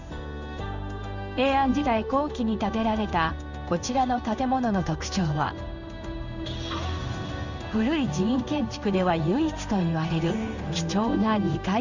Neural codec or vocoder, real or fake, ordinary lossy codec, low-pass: codec, 16 kHz in and 24 kHz out, 1 kbps, XY-Tokenizer; fake; none; 7.2 kHz